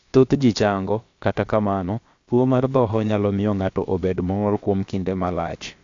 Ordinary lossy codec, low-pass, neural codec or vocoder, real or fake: AAC, 48 kbps; 7.2 kHz; codec, 16 kHz, about 1 kbps, DyCAST, with the encoder's durations; fake